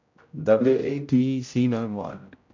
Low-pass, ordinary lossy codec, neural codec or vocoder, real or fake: 7.2 kHz; MP3, 64 kbps; codec, 16 kHz, 0.5 kbps, X-Codec, HuBERT features, trained on general audio; fake